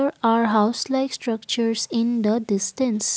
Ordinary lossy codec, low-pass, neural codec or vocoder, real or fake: none; none; none; real